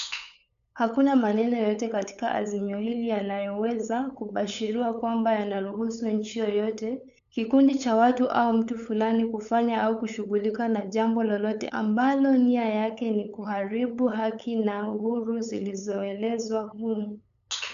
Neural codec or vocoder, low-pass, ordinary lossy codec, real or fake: codec, 16 kHz, 8 kbps, FunCodec, trained on LibriTTS, 25 frames a second; 7.2 kHz; none; fake